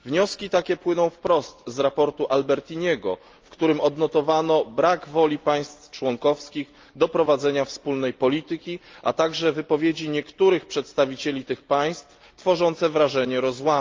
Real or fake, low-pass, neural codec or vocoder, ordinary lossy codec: real; 7.2 kHz; none; Opus, 24 kbps